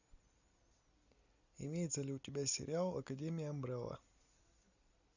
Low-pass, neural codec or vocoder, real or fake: 7.2 kHz; none; real